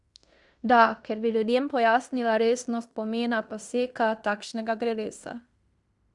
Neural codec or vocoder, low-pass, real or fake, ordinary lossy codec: codec, 16 kHz in and 24 kHz out, 0.9 kbps, LongCat-Audio-Codec, fine tuned four codebook decoder; 10.8 kHz; fake; Opus, 64 kbps